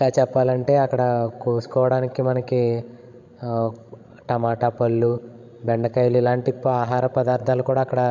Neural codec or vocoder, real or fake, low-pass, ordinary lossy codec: codec, 16 kHz, 16 kbps, FreqCodec, larger model; fake; 7.2 kHz; none